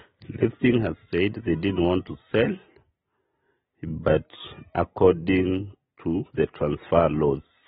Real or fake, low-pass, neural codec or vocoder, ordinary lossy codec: real; 14.4 kHz; none; AAC, 16 kbps